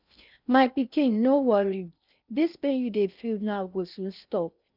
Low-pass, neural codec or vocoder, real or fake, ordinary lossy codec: 5.4 kHz; codec, 16 kHz in and 24 kHz out, 0.6 kbps, FocalCodec, streaming, 4096 codes; fake; none